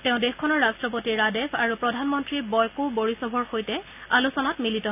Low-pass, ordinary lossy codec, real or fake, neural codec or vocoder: 3.6 kHz; none; real; none